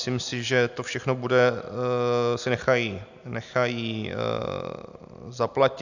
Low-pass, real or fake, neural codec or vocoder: 7.2 kHz; real; none